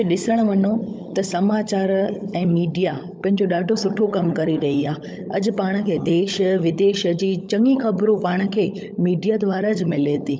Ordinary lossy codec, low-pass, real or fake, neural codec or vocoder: none; none; fake; codec, 16 kHz, 16 kbps, FunCodec, trained on LibriTTS, 50 frames a second